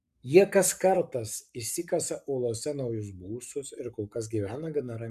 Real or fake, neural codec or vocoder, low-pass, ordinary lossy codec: fake; codec, 44.1 kHz, 7.8 kbps, Pupu-Codec; 14.4 kHz; MP3, 96 kbps